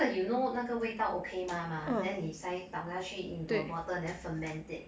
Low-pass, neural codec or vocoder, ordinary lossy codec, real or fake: none; none; none; real